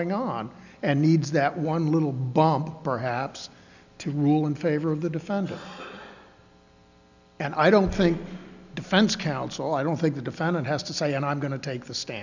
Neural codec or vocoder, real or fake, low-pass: none; real; 7.2 kHz